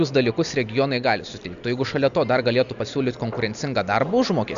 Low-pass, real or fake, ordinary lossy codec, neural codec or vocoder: 7.2 kHz; real; MP3, 96 kbps; none